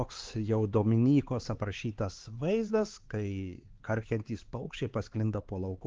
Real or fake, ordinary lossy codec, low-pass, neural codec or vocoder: fake; Opus, 16 kbps; 7.2 kHz; codec, 16 kHz, 4 kbps, X-Codec, HuBERT features, trained on LibriSpeech